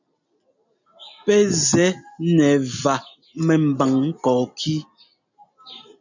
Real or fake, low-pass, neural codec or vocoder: real; 7.2 kHz; none